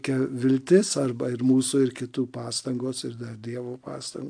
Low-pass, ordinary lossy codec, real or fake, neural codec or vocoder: 9.9 kHz; AAC, 64 kbps; real; none